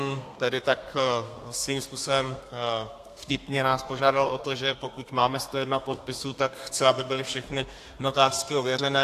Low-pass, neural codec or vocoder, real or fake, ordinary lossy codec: 14.4 kHz; codec, 32 kHz, 1.9 kbps, SNAC; fake; AAC, 64 kbps